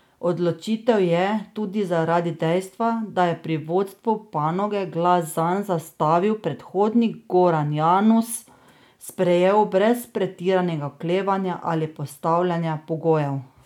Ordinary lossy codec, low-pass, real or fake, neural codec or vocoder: none; 19.8 kHz; real; none